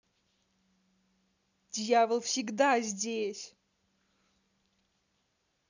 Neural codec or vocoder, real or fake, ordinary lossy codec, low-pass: none; real; none; 7.2 kHz